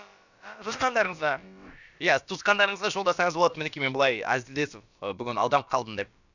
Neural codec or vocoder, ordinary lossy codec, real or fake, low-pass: codec, 16 kHz, about 1 kbps, DyCAST, with the encoder's durations; none; fake; 7.2 kHz